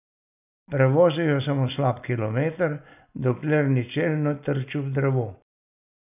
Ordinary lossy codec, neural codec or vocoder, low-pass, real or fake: none; none; 3.6 kHz; real